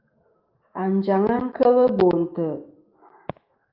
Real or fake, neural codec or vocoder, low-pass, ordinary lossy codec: fake; autoencoder, 48 kHz, 128 numbers a frame, DAC-VAE, trained on Japanese speech; 5.4 kHz; Opus, 32 kbps